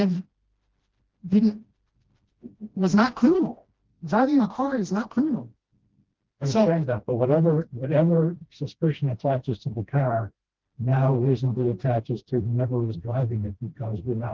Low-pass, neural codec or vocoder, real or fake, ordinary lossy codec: 7.2 kHz; codec, 16 kHz, 1 kbps, FreqCodec, smaller model; fake; Opus, 16 kbps